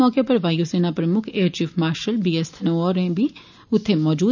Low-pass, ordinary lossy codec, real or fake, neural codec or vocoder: 7.2 kHz; none; real; none